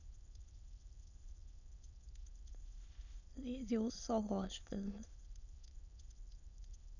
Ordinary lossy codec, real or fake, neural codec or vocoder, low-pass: none; fake; autoencoder, 22.05 kHz, a latent of 192 numbers a frame, VITS, trained on many speakers; 7.2 kHz